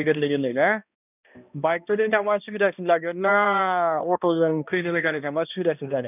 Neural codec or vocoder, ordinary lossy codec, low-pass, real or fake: codec, 16 kHz, 1 kbps, X-Codec, HuBERT features, trained on balanced general audio; none; 3.6 kHz; fake